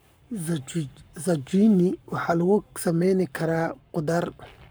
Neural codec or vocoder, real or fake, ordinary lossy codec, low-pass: codec, 44.1 kHz, 7.8 kbps, Pupu-Codec; fake; none; none